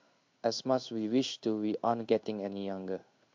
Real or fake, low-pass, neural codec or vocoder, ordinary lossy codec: fake; 7.2 kHz; codec, 16 kHz in and 24 kHz out, 1 kbps, XY-Tokenizer; MP3, 48 kbps